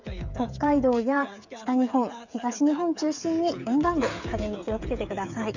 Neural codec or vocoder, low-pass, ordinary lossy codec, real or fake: codec, 16 kHz, 8 kbps, FreqCodec, smaller model; 7.2 kHz; none; fake